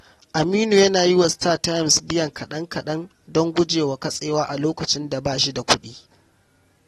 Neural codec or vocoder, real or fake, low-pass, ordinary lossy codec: none; real; 19.8 kHz; AAC, 32 kbps